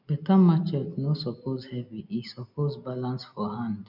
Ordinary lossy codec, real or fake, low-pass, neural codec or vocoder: none; real; 5.4 kHz; none